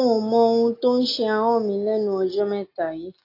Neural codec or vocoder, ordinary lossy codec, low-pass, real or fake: none; AAC, 32 kbps; 5.4 kHz; real